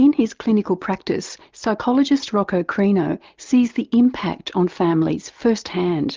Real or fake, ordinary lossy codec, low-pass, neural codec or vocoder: real; Opus, 32 kbps; 7.2 kHz; none